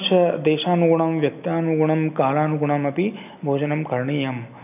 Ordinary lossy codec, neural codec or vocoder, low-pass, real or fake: none; none; 3.6 kHz; real